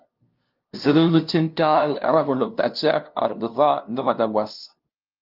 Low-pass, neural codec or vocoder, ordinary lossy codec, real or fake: 5.4 kHz; codec, 16 kHz, 0.5 kbps, FunCodec, trained on LibriTTS, 25 frames a second; Opus, 24 kbps; fake